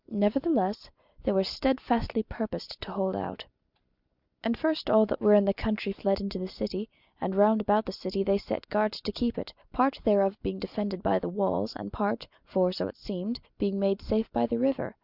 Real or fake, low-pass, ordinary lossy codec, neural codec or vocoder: real; 5.4 kHz; Opus, 64 kbps; none